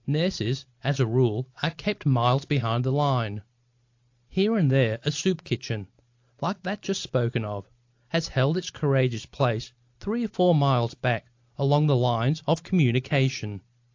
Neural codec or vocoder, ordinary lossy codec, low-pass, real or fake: none; AAC, 48 kbps; 7.2 kHz; real